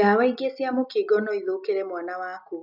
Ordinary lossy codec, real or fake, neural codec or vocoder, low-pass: none; real; none; 5.4 kHz